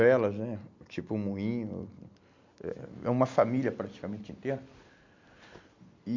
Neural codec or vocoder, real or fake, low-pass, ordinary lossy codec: none; real; 7.2 kHz; MP3, 48 kbps